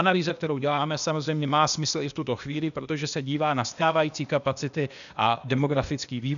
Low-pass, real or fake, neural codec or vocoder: 7.2 kHz; fake; codec, 16 kHz, 0.8 kbps, ZipCodec